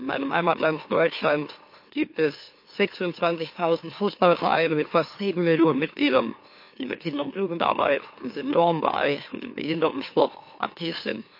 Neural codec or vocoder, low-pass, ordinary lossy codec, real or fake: autoencoder, 44.1 kHz, a latent of 192 numbers a frame, MeloTTS; 5.4 kHz; MP3, 32 kbps; fake